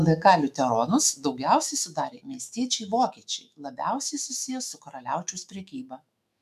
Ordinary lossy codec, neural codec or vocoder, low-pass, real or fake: MP3, 96 kbps; autoencoder, 48 kHz, 128 numbers a frame, DAC-VAE, trained on Japanese speech; 14.4 kHz; fake